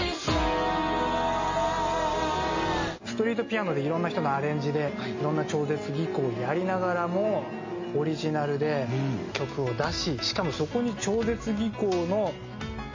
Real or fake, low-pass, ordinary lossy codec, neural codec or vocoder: real; 7.2 kHz; MP3, 32 kbps; none